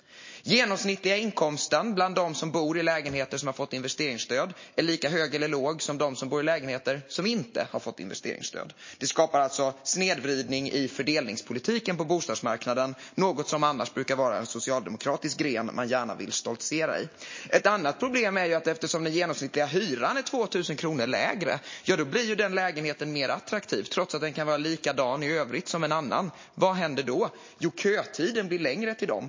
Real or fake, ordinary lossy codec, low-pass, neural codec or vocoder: real; MP3, 32 kbps; 7.2 kHz; none